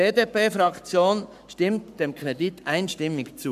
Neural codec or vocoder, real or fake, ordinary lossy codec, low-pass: codec, 44.1 kHz, 7.8 kbps, Pupu-Codec; fake; none; 14.4 kHz